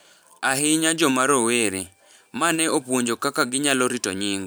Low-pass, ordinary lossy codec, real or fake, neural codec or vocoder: none; none; fake; vocoder, 44.1 kHz, 128 mel bands every 512 samples, BigVGAN v2